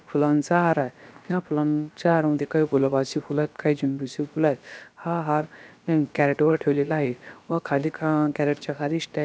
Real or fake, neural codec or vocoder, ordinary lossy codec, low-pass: fake; codec, 16 kHz, about 1 kbps, DyCAST, with the encoder's durations; none; none